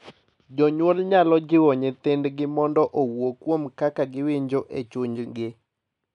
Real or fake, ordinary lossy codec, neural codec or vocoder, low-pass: real; none; none; 10.8 kHz